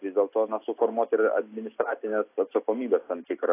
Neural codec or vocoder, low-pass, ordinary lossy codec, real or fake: none; 3.6 kHz; AAC, 24 kbps; real